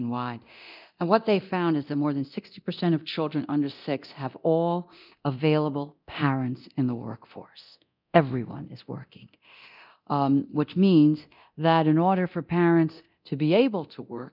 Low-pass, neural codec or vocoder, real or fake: 5.4 kHz; codec, 24 kHz, 0.9 kbps, DualCodec; fake